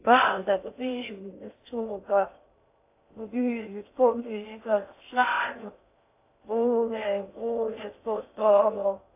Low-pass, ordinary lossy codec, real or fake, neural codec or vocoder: 3.6 kHz; AAC, 24 kbps; fake; codec, 16 kHz in and 24 kHz out, 0.6 kbps, FocalCodec, streaming, 2048 codes